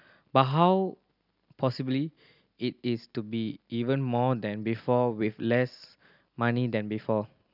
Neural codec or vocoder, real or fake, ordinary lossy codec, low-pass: none; real; none; 5.4 kHz